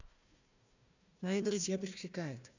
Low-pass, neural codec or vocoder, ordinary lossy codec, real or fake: 7.2 kHz; codec, 16 kHz, 1 kbps, FunCodec, trained on Chinese and English, 50 frames a second; none; fake